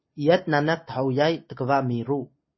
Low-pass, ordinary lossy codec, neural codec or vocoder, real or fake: 7.2 kHz; MP3, 24 kbps; none; real